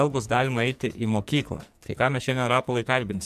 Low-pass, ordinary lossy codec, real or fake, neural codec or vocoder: 14.4 kHz; MP3, 96 kbps; fake; codec, 44.1 kHz, 2.6 kbps, SNAC